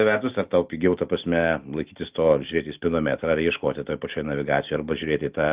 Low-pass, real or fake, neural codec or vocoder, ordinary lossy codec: 3.6 kHz; real; none; Opus, 24 kbps